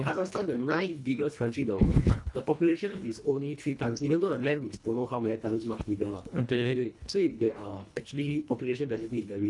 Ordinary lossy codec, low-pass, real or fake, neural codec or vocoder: none; 10.8 kHz; fake; codec, 24 kHz, 1.5 kbps, HILCodec